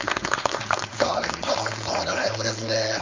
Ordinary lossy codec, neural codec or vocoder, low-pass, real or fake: MP3, 48 kbps; codec, 16 kHz, 4.8 kbps, FACodec; 7.2 kHz; fake